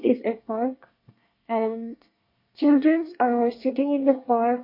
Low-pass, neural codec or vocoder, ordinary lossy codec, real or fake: 5.4 kHz; codec, 24 kHz, 1 kbps, SNAC; MP3, 32 kbps; fake